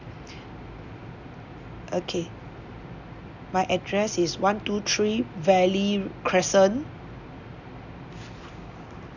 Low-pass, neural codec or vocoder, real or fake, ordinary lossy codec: 7.2 kHz; none; real; none